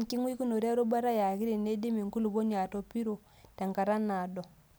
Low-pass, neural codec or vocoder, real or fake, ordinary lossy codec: none; none; real; none